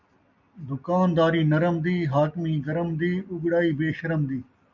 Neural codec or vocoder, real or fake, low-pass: none; real; 7.2 kHz